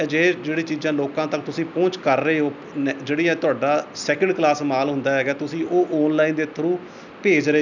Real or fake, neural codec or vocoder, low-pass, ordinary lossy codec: real; none; 7.2 kHz; none